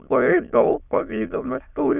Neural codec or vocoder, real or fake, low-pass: autoencoder, 22.05 kHz, a latent of 192 numbers a frame, VITS, trained on many speakers; fake; 3.6 kHz